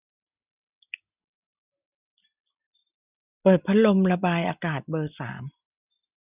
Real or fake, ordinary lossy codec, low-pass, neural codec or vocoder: real; none; 3.6 kHz; none